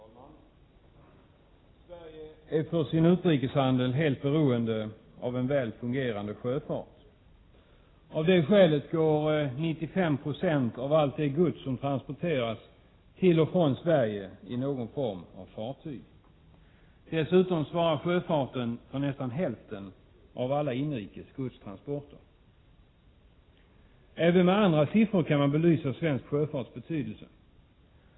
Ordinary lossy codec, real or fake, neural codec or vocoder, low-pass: AAC, 16 kbps; real; none; 7.2 kHz